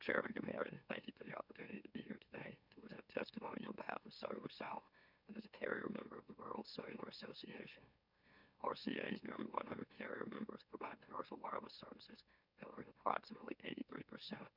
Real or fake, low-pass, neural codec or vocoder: fake; 5.4 kHz; autoencoder, 44.1 kHz, a latent of 192 numbers a frame, MeloTTS